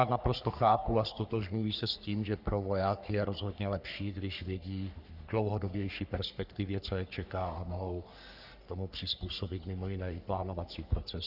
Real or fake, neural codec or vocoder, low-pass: fake; codec, 44.1 kHz, 3.4 kbps, Pupu-Codec; 5.4 kHz